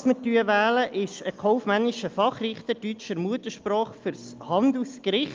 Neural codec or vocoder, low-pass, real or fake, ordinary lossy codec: none; 7.2 kHz; real; Opus, 24 kbps